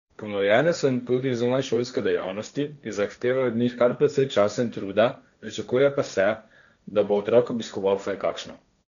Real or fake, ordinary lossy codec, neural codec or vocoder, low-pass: fake; none; codec, 16 kHz, 1.1 kbps, Voila-Tokenizer; 7.2 kHz